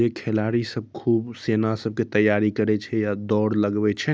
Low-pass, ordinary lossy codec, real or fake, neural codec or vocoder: none; none; real; none